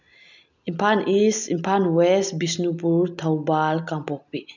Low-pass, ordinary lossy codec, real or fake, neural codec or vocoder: 7.2 kHz; none; real; none